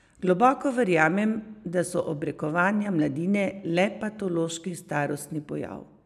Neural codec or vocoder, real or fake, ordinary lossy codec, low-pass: none; real; none; 14.4 kHz